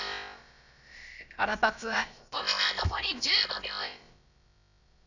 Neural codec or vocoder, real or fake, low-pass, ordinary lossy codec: codec, 16 kHz, about 1 kbps, DyCAST, with the encoder's durations; fake; 7.2 kHz; none